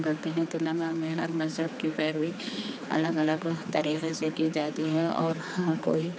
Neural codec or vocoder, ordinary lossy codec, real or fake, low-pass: codec, 16 kHz, 4 kbps, X-Codec, HuBERT features, trained on general audio; none; fake; none